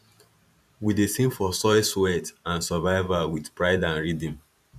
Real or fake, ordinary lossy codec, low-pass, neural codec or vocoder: fake; none; 14.4 kHz; vocoder, 44.1 kHz, 128 mel bands every 512 samples, BigVGAN v2